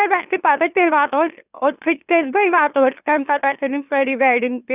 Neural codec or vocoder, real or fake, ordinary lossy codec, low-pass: autoencoder, 44.1 kHz, a latent of 192 numbers a frame, MeloTTS; fake; none; 3.6 kHz